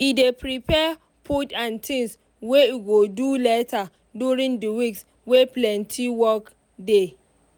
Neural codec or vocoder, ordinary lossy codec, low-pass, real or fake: none; none; none; real